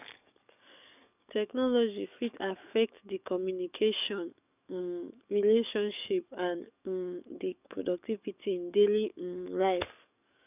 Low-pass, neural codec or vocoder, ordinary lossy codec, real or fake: 3.6 kHz; codec, 44.1 kHz, 7.8 kbps, DAC; none; fake